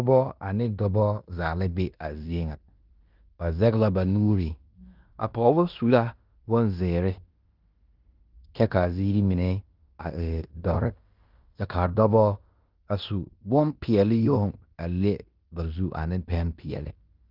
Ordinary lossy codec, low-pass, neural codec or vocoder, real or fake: Opus, 24 kbps; 5.4 kHz; codec, 16 kHz in and 24 kHz out, 0.9 kbps, LongCat-Audio-Codec, fine tuned four codebook decoder; fake